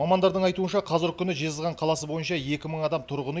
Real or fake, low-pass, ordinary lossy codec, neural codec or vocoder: real; none; none; none